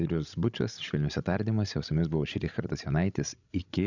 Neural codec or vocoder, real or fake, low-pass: codec, 16 kHz, 16 kbps, FreqCodec, larger model; fake; 7.2 kHz